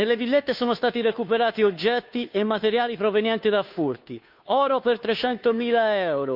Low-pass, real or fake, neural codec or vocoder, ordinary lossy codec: 5.4 kHz; fake; codec, 16 kHz, 2 kbps, FunCodec, trained on Chinese and English, 25 frames a second; none